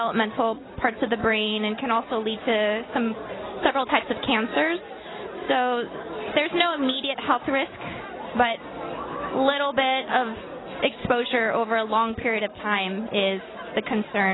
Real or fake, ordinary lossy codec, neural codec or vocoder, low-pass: real; AAC, 16 kbps; none; 7.2 kHz